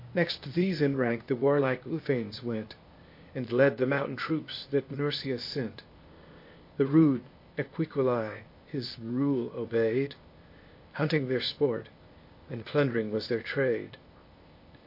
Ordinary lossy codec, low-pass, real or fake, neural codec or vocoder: MP3, 32 kbps; 5.4 kHz; fake; codec, 16 kHz, 0.8 kbps, ZipCodec